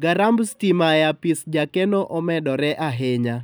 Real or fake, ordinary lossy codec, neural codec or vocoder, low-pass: real; none; none; none